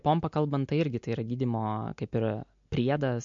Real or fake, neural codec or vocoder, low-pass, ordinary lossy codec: real; none; 7.2 kHz; MP3, 48 kbps